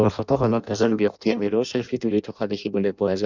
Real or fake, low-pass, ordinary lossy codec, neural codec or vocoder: fake; 7.2 kHz; none; codec, 16 kHz in and 24 kHz out, 0.6 kbps, FireRedTTS-2 codec